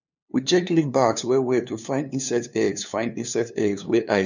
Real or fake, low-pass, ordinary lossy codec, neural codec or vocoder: fake; 7.2 kHz; none; codec, 16 kHz, 2 kbps, FunCodec, trained on LibriTTS, 25 frames a second